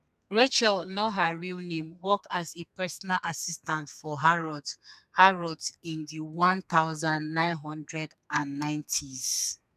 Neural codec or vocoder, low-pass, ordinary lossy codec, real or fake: codec, 32 kHz, 1.9 kbps, SNAC; 14.4 kHz; none; fake